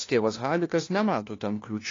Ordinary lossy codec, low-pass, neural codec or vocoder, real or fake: AAC, 32 kbps; 7.2 kHz; codec, 16 kHz, 1 kbps, FunCodec, trained on LibriTTS, 50 frames a second; fake